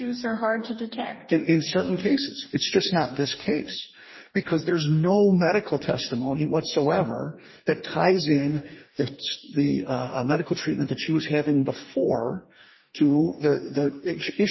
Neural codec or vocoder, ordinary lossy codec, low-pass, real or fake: codec, 44.1 kHz, 2.6 kbps, DAC; MP3, 24 kbps; 7.2 kHz; fake